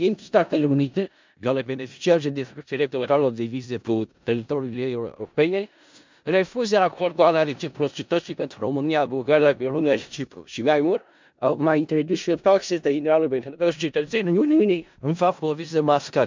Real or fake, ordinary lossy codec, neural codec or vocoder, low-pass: fake; MP3, 64 kbps; codec, 16 kHz in and 24 kHz out, 0.4 kbps, LongCat-Audio-Codec, four codebook decoder; 7.2 kHz